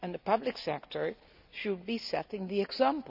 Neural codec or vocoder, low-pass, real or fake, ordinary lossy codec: none; 5.4 kHz; real; none